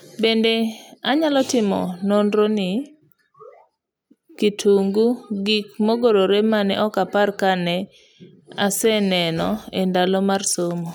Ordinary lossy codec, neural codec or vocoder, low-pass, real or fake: none; none; none; real